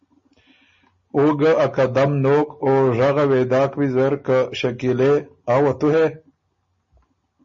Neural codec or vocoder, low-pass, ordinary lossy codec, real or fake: none; 7.2 kHz; MP3, 32 kbps; real